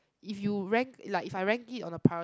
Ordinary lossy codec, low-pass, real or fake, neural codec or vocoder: none; none; real; none